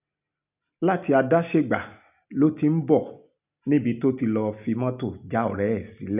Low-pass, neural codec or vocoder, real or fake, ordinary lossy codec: 3.6 kHz; none; real; none